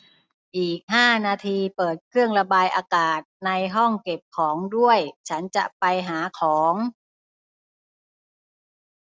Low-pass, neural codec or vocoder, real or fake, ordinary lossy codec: none; none; real; none